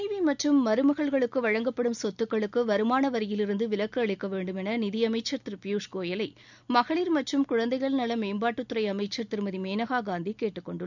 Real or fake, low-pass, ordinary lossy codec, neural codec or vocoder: real; 7.2 kHz; none; none